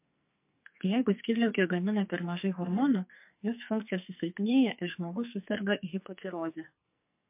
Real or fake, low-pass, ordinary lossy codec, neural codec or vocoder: fake; 3.6 kHz; MP3, 32 kbps; codec, 44.1 kHz, 2.6 kbps, SNAC